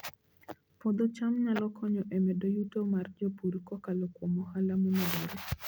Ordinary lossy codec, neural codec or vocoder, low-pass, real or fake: none; none; none; real